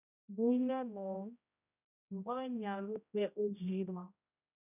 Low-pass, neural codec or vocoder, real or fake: 3.6 kHz; codec, 16 kHz, 0.5 kbps, X-Codec, HuBERT features, trained on general audio; fake